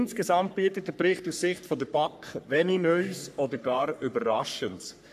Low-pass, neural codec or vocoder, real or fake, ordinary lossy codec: 14.4 kHz; codec, 44.1 kHz, 3.4 kbps, Pupu-Codec; fake; AAC, 96 kbps